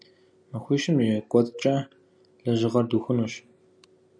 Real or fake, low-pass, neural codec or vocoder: real; 9.9 kHz; none